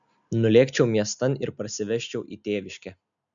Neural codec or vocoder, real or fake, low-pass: none; real; 7.2 kHz